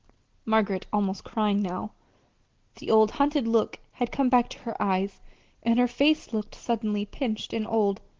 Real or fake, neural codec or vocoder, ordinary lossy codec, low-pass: real; none; Opus, 16 kbps; 7.2 kHz